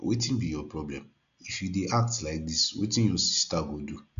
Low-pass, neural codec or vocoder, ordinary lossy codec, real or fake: 7.2 kHz; none; none; real